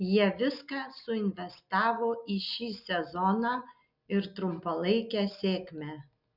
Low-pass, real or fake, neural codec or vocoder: 5.4 kHz; real; none